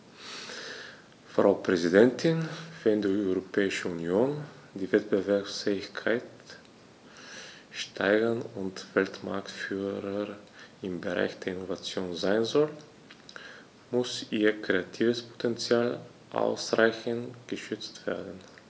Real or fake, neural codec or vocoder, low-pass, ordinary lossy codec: real; none; none; none